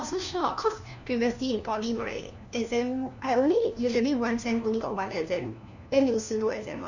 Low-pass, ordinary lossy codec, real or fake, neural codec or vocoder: 7.2 kHz; none; fake; codec, 16 kHz, 1 kbps, FunCodec, trained on LibriTTS, 50 frames a second